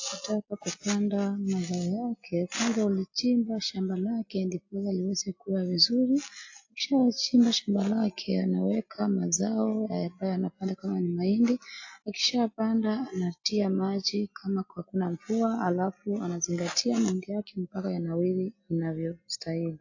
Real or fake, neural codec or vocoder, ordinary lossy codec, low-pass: real; none; AAC, 48 kbps; 7.2 kHz